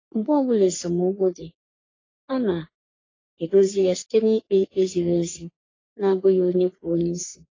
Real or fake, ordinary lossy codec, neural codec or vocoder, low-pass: fake; AAC, 32 kbps; codec, 44.1 kHz, 3.4 kbps, Pupu-Codec; 7.2 kHz